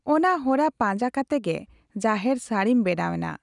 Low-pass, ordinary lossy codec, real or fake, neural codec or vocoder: 10.8 kHz; none; real; none